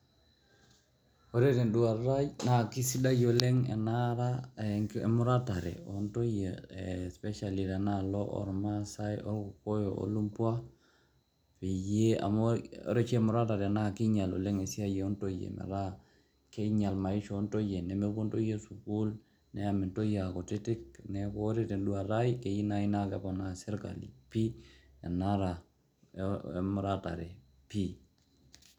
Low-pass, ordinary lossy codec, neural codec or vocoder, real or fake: 19.8 kHz; none; none; real